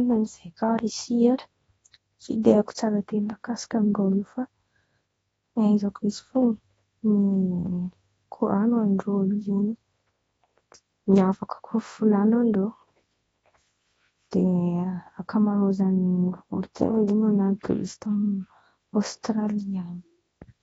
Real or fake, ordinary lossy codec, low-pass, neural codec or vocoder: fake; AAC, 24 kbps; 10.8 kHz; codec, 24 kHz, 0.9 kbps, WavTokenizer, large speech release